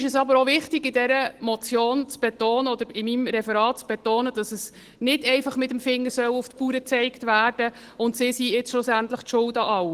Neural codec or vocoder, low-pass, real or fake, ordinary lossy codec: none; 14.4 kHz; real; Opus, 16 kbps